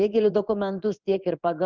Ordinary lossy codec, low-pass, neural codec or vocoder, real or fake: Opus, 24 kbps; 7.2 kHz; codec, 16 kHz in and 24 kHz out, 1 kbps, XY-Tokenizer; fake